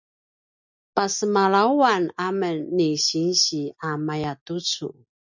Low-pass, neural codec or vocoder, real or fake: 7.2 kHz; none; real